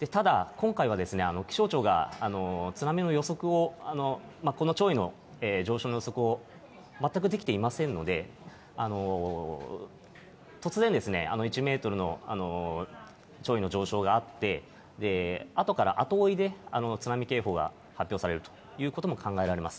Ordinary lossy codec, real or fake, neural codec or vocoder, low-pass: none; real; none; none